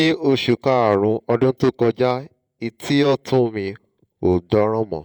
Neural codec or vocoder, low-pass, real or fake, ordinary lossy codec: vocoder, 48 kHz, 128 mel bands, Vocos; 19.8 kHz; fake; none